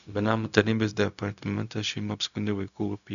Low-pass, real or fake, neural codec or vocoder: 7.2 kHz; fake; codec, 16 kHz, 0.4 kbps, LongCat-Audio-Codec